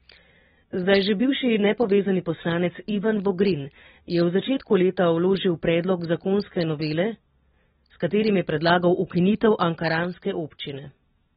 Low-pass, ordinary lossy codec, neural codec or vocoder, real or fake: 9.9 kHz; AAC, 16 kbps; none; real